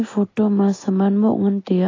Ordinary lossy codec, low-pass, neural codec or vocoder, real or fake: AAC, 32 kbps; 7.2 kHz; none; real